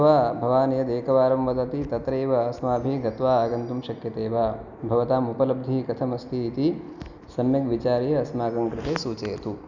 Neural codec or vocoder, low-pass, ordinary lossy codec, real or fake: none; 7.2 kHz; none; real